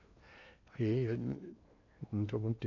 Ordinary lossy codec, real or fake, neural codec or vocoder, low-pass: none; fake; codec, 16 kHz in and 24 kHz out, 0.8 kbps, FocalCodec, streaming, 65536 codes; 7.2 kHz